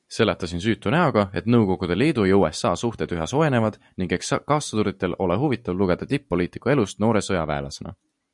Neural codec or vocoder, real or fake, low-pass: none; real; 10.8 kHz